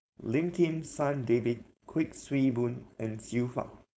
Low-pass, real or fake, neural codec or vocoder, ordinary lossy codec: none; fake; codec, 16 kHz, 4.8 kbps, FACodec; none